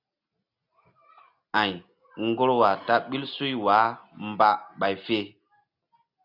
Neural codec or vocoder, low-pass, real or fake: none; 5.4 kHz; real